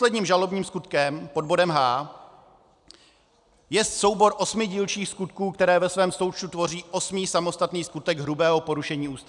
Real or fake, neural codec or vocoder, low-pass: real; none; 10.8 kHz